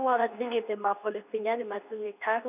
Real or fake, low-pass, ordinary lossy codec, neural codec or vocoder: fake; 3.6 kHz; none; codec, 24 kHz, 0.9 kbps, WavTokenizer, medium speech release version 2